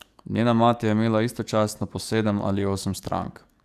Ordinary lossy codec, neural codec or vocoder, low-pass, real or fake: none; codec, 44.1 kHz, 7.8 kbps, DAC; 14.4 kHz; fake